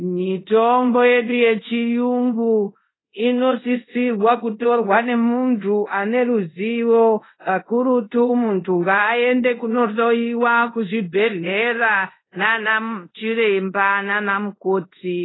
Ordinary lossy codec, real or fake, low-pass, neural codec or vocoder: AAC, 16 kbps; fake; 7.2 kHz; codec, 24 kHz, 0.5 kbps, DualCodec